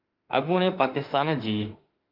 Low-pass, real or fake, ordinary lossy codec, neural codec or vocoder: 5.4 kHz; fake; Opus, 24 kbps; autoencoder, 48 kHz, 32 numbers a frame, DAC-VAE, trained on Japanese speech